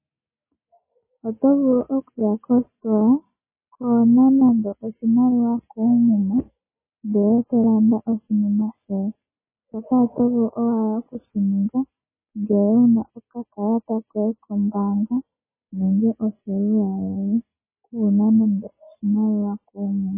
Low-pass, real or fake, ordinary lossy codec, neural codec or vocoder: 3.6 kHz; real; MP3, 16 kbps; none